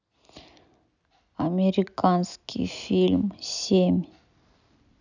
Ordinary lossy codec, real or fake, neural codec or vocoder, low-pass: none; real; none; 7.2 kHz